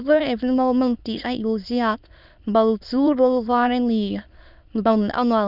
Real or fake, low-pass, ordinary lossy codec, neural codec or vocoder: fake; 5.4 kHz; none; autoencoder, 22.05 kHz, a latent of 192 numbers a frame, VITS, trained on many speakers